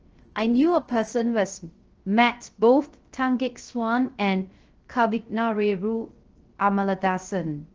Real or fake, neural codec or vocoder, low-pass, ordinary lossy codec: fake; codec, 16 kHz, 0.3 kbps, FocalCodec; 7.2 kHz; Opus, 16 kbps